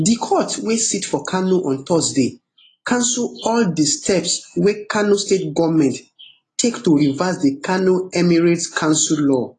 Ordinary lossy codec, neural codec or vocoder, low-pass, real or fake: AAC, 32 kbps; none; 10.8 kHz; real